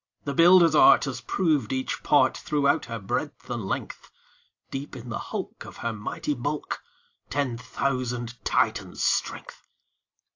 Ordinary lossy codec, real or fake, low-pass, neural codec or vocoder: AAC, 48 kbps; real; 7.2 kHz; none